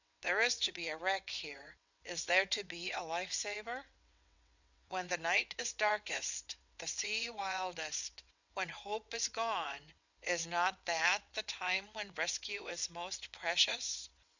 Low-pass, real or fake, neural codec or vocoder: 7.2 kHz; fake; vocoder, 22.05 kHz, 80 mel bands, WaveNeXt